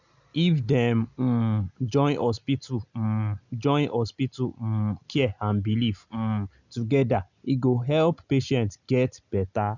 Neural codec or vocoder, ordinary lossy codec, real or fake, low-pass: none; none; real; 7.2 kHz